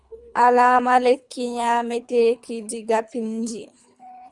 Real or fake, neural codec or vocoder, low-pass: fake; codec, 24 kHz, 3 kbps, HILCodec; 10.8 kHz